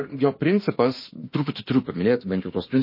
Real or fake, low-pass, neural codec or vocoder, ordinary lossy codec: fake; 5.4 kHz; autoencoder, 48 kHz, 32 numbers a frame, DAC-VAE, trained on Japanese speech; MP3, 24 kbps